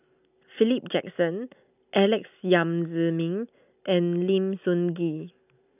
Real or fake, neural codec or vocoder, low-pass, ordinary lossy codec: real; none; 3.6 kHz; none